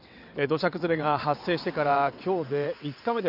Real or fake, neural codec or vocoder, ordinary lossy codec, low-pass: fake; vocoder, 22.05 kHz, 80 mel bands, WaveNeXt; none; 5.4 kHz